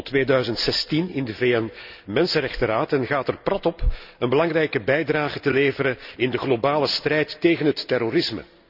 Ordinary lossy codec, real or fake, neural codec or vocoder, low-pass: none; real; none; 5.4 kHz